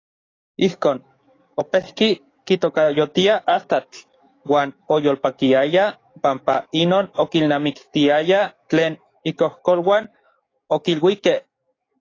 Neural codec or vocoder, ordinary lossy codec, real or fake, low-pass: codec, 44.1 kHz, 7.8 kbps, DAC; AAC, 32 kbps; fake; 7.2 kHz